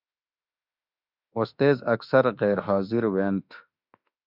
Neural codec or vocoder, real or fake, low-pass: autoencoder, 48 kHz, 32 numbers a frame, DAC-VAE, trained on Japanese speech; fake; 5.4 kHz